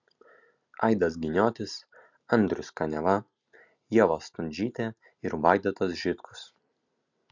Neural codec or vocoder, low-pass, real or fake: none; 7.2 kHz; real